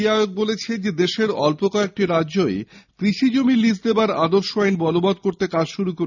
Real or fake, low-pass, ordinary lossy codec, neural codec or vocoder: real; 7.2 kHz; none; none